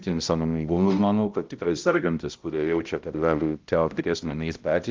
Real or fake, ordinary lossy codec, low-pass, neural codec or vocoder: fake; Opus, 32 kbps; 7.2 kHz; codec, 16 kHz, 0.5 kbps, X-Codec, HuBERT features, trained on balanced general audio